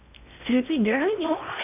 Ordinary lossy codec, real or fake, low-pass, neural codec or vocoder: none; fake; 3.6 kHz; codec, 16 kHz in and 24 kHz out, 0.6 kbps, FocalCodec, streaming, 2048 codes